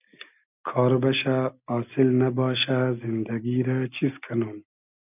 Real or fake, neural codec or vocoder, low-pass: real; none; 3.6 kHz